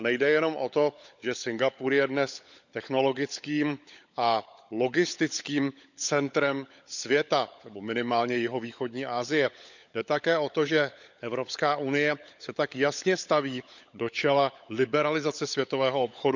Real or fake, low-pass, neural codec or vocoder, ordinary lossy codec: fake; 7.2 kHz; codec, 16 kHz, 16 kbps, FunCodec, trained on LibriTTS, 50 frames a second; none